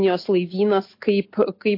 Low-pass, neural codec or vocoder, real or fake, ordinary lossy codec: 5.4 kHz; none; real; MP3, 32 kbps